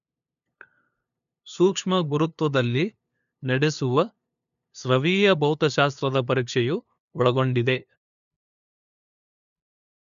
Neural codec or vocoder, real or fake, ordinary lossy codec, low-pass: codec, 16 kHz, 2 kbps, FunCodec, trained on LibriTTS, 25 frames a second; fake; none; 7.2 kHz